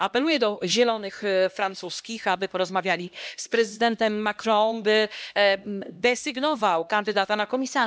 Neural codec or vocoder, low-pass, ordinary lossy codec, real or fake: codec, 16 kHz, 1 kbps, X-Codec, HuBERT features, trained on LibriSpeech; none; none; fake